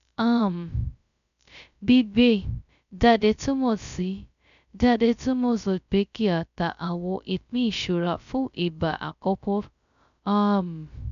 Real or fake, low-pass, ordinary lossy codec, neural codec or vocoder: fake; 7.2 kHz; none; codec, 16 kHz, 0.2 kbps, FocalCodec